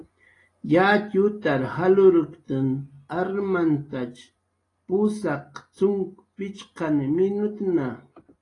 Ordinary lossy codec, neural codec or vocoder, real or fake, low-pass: AAC, 32 kbps; none; real; 10.8 kHz